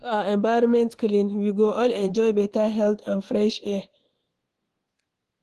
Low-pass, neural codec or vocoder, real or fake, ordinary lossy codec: 10.8 kHz; codec, 24 kHz, 1.2 kbps, DualCodec; fake; Opus, 16 kbps